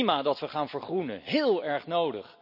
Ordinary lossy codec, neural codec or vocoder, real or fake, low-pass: none; none; real; 5.4 kHz